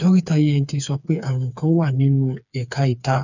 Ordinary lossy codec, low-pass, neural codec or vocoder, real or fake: none; 7.2 kHz; codec, 16 kHz, 4 kbps, FreqCodec, smaller model; fake